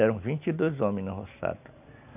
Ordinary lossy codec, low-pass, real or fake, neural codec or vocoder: none; 3.6 kHz; real; none